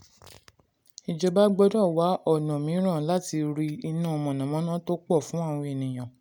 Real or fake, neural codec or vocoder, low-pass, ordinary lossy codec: real; none; none; none